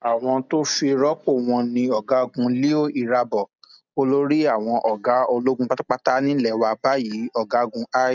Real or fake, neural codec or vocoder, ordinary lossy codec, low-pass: real; none; none; 7.2 kHz